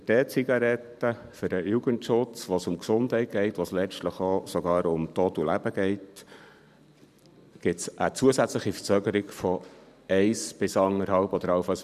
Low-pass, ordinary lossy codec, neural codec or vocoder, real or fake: 14.4 kHz; none; vocoder, 44.1 kHz, 128 mel bands every 512 samples, BigVGAN v2; fake